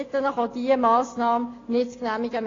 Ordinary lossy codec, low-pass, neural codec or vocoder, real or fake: AAC, 32 kbps; 7.2 kHz; none; real